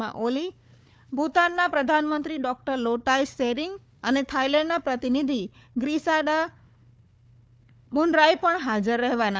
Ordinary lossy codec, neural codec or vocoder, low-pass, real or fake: none; codec, 16 kHz, 4 kbps, FunCodec, trained on Chinese and English, 50 frames a second; none; fake